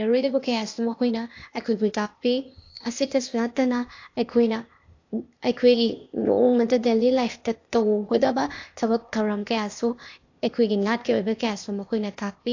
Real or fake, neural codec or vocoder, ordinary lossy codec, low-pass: fake; codec, 16 kHz, 0.8 kbps, ZipCodec; AAC, 48 kbps; 7.2 kHz